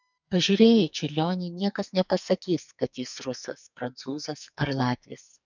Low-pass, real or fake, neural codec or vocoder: 7.2 kHz; fake; codec, 44.1 kHz, 2.6 kbps, SNAC